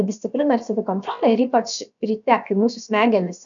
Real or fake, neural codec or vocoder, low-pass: fake; codec, 16 kHz, about 1 kbps, DyCAST, with the encoder's durations; 7.2 kHz